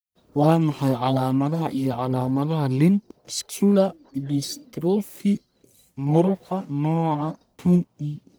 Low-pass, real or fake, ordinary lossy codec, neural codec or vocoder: none; fake; none; codec, 44.1 kHz, 1.7 kbps, Pupu-Codec